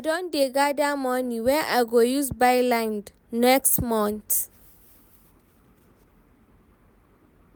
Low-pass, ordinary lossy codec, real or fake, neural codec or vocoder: none; none; real; none